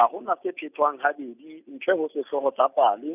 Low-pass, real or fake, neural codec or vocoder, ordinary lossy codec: 3.6 kHz; real; none; none